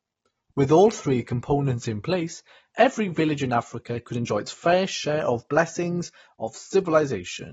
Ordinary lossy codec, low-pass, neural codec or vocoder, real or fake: AAC, 24 kbps; 19.8 kHz; none; real